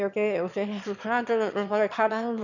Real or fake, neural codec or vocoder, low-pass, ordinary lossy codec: fake; autoencoder, 22.05 kHz, a latent of 192 numbers a frame, VITS, trained on one speaker; 7.2 kHz; none